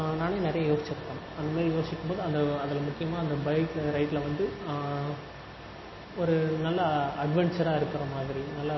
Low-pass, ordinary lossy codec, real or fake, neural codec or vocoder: 7.2 kHz; MP3, 24 kbps; real; none